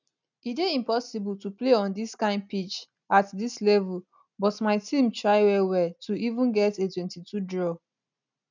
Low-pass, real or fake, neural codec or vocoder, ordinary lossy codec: 7.2 kHz; real; none; none